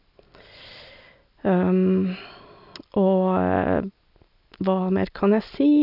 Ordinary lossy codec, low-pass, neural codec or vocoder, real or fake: none; 5.4 kHz; none; real